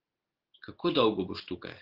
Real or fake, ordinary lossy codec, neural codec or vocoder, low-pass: real; Opus, 16 kbps; none; 5.4 kHz